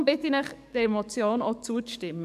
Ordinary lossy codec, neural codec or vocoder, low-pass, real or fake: none; codec, 44.1 kHz, 7.8 kbps, DAC; 14.4 kHz; fake